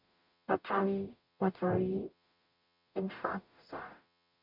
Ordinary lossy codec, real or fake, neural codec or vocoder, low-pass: none; fake; codec, 44.1 kHz, 0.9 kbps, DAC; 5.4 kHz